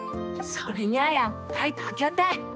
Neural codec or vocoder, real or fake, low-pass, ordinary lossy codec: codec, 16 kHz, 2 kbps, X-Codec, HuBERT features, trained on balanced general audio; fake; none; none